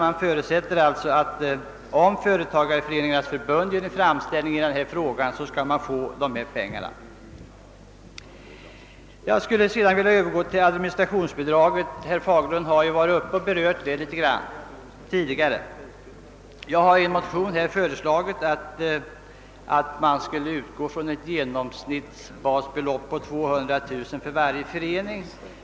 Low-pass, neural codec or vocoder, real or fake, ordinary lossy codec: none; none; real; none